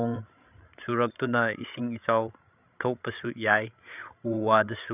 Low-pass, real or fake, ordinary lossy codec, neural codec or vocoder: 3.6 kHz; fake; none; codec, 16 kHz, 16 kbps, FreqCodec, larger model